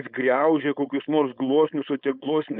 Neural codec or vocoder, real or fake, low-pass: codec, 16 kHz, 4.8 kbps, FACodec; fake; 5.4 kHz